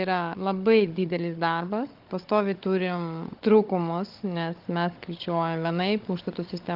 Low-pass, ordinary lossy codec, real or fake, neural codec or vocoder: 5.4 kHz; Opus, 32 kbps; fake; codec, 16 kHz, 16 kbps, FunCodec, trained on Chinese and English, 50 frames a second